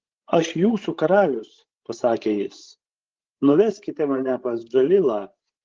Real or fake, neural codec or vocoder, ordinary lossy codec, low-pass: fake; codec, 16 kHz, 16 kbps, FreqCodec, larger model; Opus, 16 kbps; 7.2 kHz